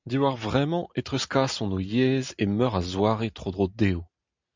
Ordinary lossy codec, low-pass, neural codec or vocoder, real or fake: MP3, 64 kbps; 7.2 kHz; none; real